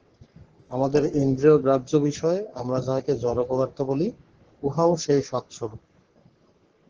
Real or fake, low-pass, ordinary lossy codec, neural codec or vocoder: fake; 7.2 kHz; Opus, 16 kbps; codec, 44.1 kHz, 3.4 kbps, Pupu-Codec